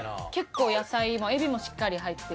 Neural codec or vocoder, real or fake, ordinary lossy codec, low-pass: none; real; none; none